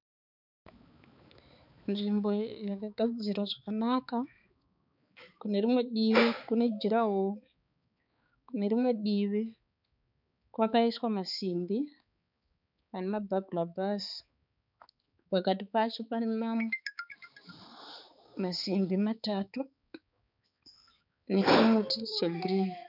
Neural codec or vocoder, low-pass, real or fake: codec, 16 kHz, 4 kbps, X-Codec, HuBERT features, trained on balanced general audio; 5.4 kHz; fake